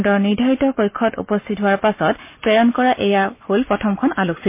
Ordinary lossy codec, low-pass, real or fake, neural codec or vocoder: MP3, 24 kbps; 3.6 kHz; real; none